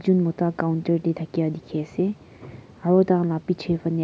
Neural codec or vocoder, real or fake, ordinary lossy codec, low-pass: none; real; none; none